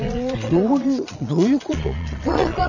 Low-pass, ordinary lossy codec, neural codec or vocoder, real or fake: 7.2 kHz; none; vocoder, 22.05 kHz, 80 mel bands, Vocos; fake